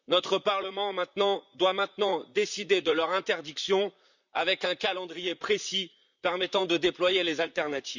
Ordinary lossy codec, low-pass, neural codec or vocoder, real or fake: none; 7.2 kHz; vocoder, 44.1 kHz, 128 mel bands, Pupu-Vocoder; fake